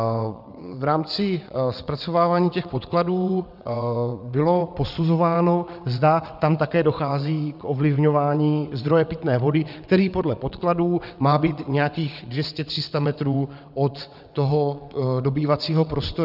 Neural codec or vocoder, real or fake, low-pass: vocoder, 22.05 kHz, 80 mel bands, WaveNeXt; fake; 5.4 kHz